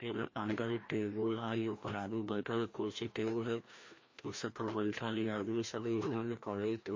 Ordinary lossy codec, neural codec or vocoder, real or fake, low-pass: MP3, 32 kbps; codec, 16 kHz, 1 kbps, FreqCodec, larger model; fake; 7.2 kHz